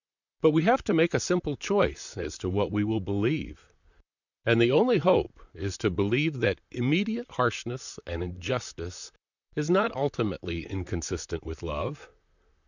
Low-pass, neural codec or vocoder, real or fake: 7.2 kHz; vocoder, 44.1 kHz, 128 mel bands, Pupu-Vocoder; fake